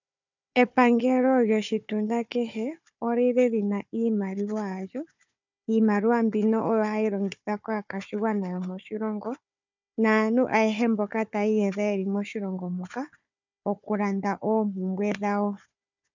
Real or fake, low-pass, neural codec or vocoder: fake; 7.2 kHz; codec, 16 kHz, 4 kbps, FunCodec, trained on Chinese and English, 50 frames a second